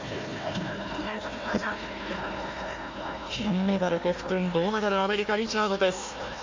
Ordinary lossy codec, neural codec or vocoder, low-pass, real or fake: MP3, 48 kbps; codec, 16 kHz, 1 kbps, FunCodec, trained on Chinese and English, 50 frames a second; 7.2 kHz; fake